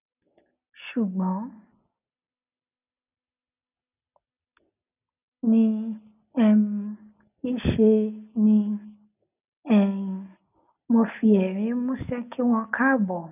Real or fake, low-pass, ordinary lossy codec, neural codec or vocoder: real; 3.6 kHz; none; none